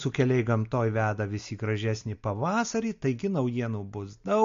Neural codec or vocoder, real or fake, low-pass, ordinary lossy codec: none; real; 7.2 kHz; MP3, 48 kbps